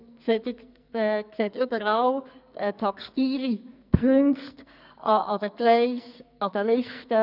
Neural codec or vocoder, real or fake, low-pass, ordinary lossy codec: codec, 44.1 kHz, 2.6 kbps, SNAC; fake; 5.4 kHz; none